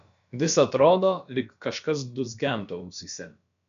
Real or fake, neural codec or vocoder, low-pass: fake; codec, 16 kHz, about 1 kbps, DyCAST, with the encoder's durations; 7.2 kHz